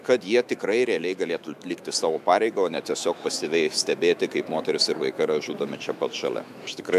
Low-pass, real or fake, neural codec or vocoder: 14.4 kHz; fake; autoencoder, 48 kHz, 128 numbers a frame, DAC-VAE, trained on Japanese speech